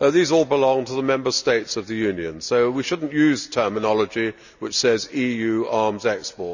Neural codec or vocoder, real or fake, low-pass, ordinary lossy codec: none; real; 7.2 kHz; none